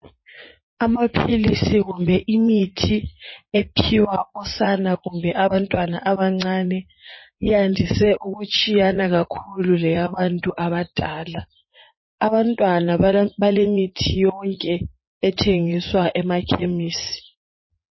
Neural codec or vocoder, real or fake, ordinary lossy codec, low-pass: vocoder, 44.1 kHz, 80 mel bands, Vocos; fake; MP3, 24 kbps; 7.2 kHz